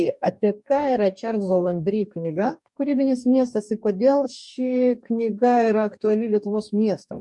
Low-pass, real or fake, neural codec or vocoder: 10.8 kHz; fake; codec, 44.1 kHz, 2.6 kbps, DAC